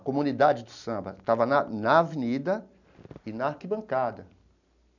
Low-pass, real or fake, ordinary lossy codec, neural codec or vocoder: 7.2 kHz; real; none; none